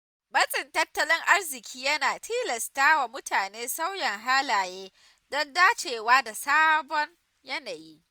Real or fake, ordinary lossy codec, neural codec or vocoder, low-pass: real; none; none; none